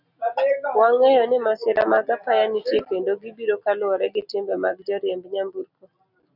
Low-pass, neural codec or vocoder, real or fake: 5.4 kHz; none; real